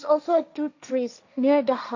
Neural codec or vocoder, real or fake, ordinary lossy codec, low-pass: codec, 16 kHz, 1.1 kbps, Voila-Tokenizer; fake; AAC, 48 kbps; 7.2 kHz